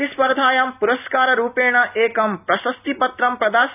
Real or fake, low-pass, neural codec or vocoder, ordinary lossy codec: real; 3.6 kHz; none; none